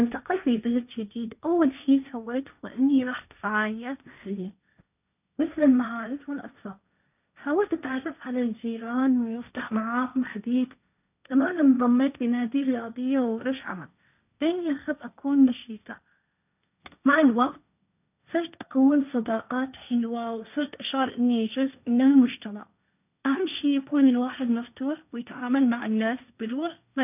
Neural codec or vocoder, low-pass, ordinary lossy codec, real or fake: codec, 16 kHz, 1.1 kbps, Voila-Tokenizer; 3.6 kHz; none; fake